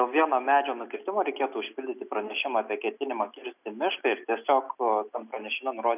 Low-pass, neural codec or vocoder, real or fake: 3.6 kHz; none; real